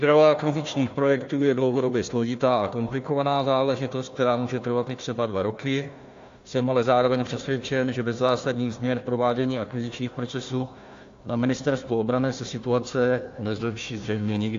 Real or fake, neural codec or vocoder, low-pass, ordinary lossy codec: fake; codec, 16 kHz, 1 kbps, FunCodec, trained on Chinese and English, 50 frames a second; 7.2 kHz; AAC, 48 kbps